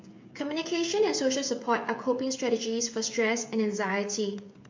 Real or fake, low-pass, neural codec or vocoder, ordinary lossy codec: fake; 7.2 kHz; codec, 16 kHz, 16 kbps, FreqCodec, smaller model; MP3, 48 kbps